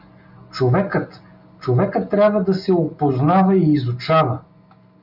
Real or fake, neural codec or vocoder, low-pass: real; none; 5.4 kHz